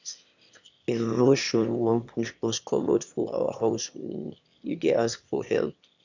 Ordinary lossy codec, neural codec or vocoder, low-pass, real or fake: none; autoencoder, 22.05 kHz, a latent of 192 numbers a frame, VITS, trained on one speaker; 7.2 kHz; fake